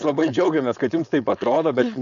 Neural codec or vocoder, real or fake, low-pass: codec, 16 kHz, 4.8 kbps, FACodec; fake; 7.2 kHz